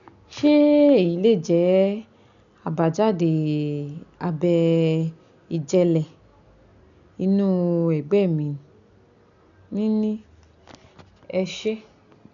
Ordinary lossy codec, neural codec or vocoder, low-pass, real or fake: none; none; 7.2 kHz; real